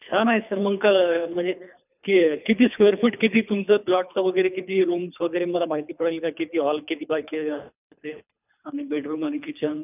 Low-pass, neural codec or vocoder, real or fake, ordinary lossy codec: 3.6 kHz; codec, 24 kHz, 3 kbps, HILCodec; fake; none